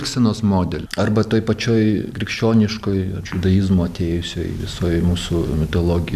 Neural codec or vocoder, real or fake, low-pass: none; real; 14.4 kHz